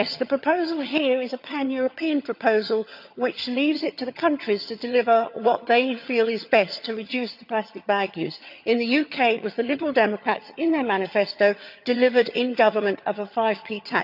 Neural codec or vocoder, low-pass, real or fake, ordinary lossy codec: vocoder, 22.05 kHz, 80 mel bands, HiFi-GAN; 5.4 kHz; fake; none